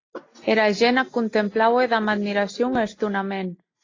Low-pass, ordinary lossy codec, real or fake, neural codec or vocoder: 7.2 kHz; AAC, 32 kbps; real; none